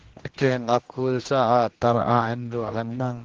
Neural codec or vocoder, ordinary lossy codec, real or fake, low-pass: codec, 16 kHz, 1 kbps, X-Codec, HuBERT features, trained on general audio; Opus, 16 kbps; fake; 7.2 kHz